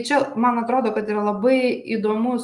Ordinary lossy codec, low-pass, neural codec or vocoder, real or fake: Opus, 32 kbps; 10.8 kHz; none; real